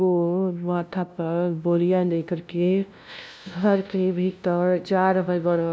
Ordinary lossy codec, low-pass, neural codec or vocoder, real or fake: none; none; codec, 16 kHz, 0.5 kbps, FunCodec, trained on LibriTTS, 25 frames a second; fake